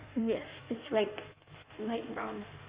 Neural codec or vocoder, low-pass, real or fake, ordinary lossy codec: codec, 16 kHz in and 24 kHz out, 1.1 kbps, FireRedTTS-2 codec; 3.6 kHz; fake; Opus, 64 kbps